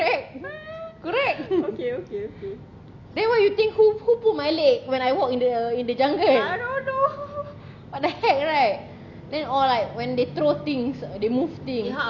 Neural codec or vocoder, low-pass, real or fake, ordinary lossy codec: none; 7.2 kHz; real; none